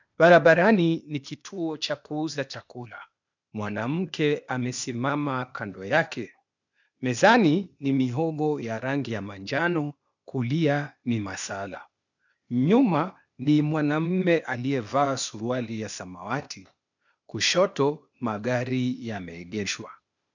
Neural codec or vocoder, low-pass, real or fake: codec, 16 kHz, 0.8 kbps, ZipCodec; 7.2 kHz; fake